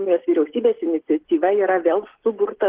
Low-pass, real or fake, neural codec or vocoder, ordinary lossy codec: 3.6 kHz; real; none; Opus, 16 kbps